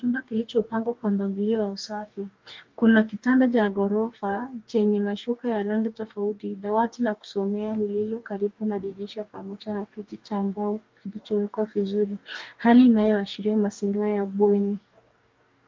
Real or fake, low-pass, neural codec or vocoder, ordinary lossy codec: fake; 7.2 kHz; codec, 44.1 kHz, 2.6 kbps, DAC; Opus, 24 kbps